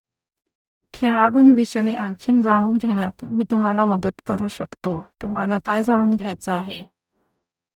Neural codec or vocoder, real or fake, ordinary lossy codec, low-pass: codec, 44.1 kHz, 0.9 kbps, DAC; fake; none; 19.8 kHz